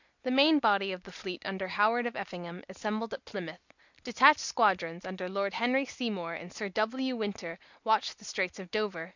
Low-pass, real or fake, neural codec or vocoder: 7.2 kHz; real; none